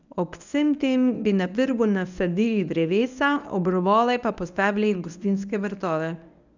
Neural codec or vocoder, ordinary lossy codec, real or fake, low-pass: codec, 24 kHz, 0.9 kbps, WavTokenizer, medium speech release version 1; none; fake; 7.2 kHz